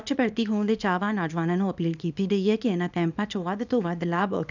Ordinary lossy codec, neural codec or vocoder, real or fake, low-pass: none; codec, 24 kHz, 0.9 kbps, WavTokenizer, small release; fake; 7.2 kHz